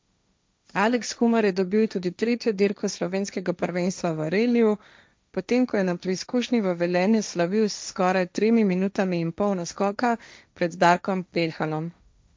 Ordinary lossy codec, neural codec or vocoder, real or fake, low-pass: none; codec, 16 kHz, 1.1 kbps, Voila-Tokenizer; fake; none